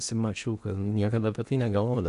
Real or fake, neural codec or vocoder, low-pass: fake; codec, 16 kHz in and 24 kHz out, 0.8 kbps, FocalCodec, streaming, 65536 codes; 10.8 kHz